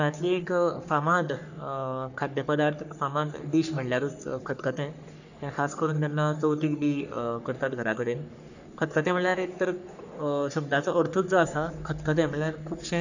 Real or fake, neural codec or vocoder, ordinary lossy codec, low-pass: fake; codec, 44.1 kHz, 3.4 kbps, Pupu-Codec; none; 7.2 kHz